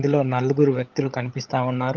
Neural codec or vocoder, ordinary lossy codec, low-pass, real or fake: codec, 16 kHz, 16 kbps, FunCodec, trained on LibriTTS, 50 frames a second; Opus, 32 kbps; 7.2 kHz; fake